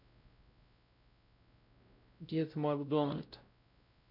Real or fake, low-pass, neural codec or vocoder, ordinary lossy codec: fake; 5.4 kHz; codec, 16 kHz, 0.5 kbps, X-Codec, WavLM features, trained on Multilingual LibriSpeech; none